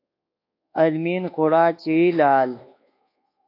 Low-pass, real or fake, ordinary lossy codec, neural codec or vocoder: 5.4 kHz; fake; AAC, 32 kbps; codec, 24 kHz, 1.2 kbps, DualCodec